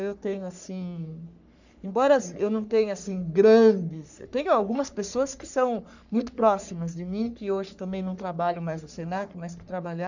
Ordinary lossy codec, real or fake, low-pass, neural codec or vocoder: none; fake; 7.2 kHz; codec, 44.1 kHz, 3.4 kbps, Pupu-Codec